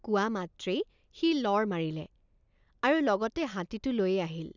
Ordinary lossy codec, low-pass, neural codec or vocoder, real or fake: none; 7.2 kHz; none; real